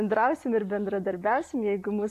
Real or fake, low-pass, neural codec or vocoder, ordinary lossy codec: real; 14.4 kHz; none; AAC, 64 kbps